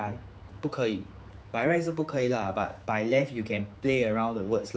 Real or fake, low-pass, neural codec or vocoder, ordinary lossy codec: fake; none; codec, 16 kHz, 4 kbps, X-Codec, HuBERT features, trained on general audio; none